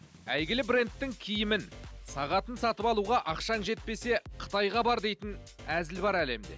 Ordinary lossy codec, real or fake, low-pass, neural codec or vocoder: none; real; none; none